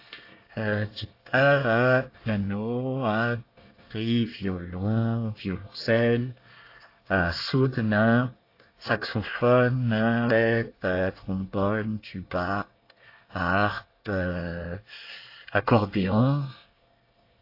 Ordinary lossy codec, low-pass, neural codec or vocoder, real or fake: AAC, 32 kbps; 5.4 kHz; codec, 24 kHz, 1 kbps, SNAC; fake